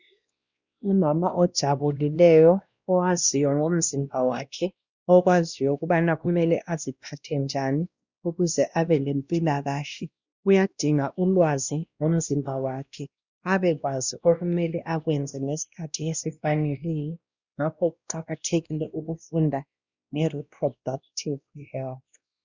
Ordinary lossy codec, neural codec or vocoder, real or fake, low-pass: Opus, 64 kbps; codec, 16 kHz, 1 kbps, X-Codec, WavLM features, trained on Multilingual LibriSpeech; fake; 7.2 kHz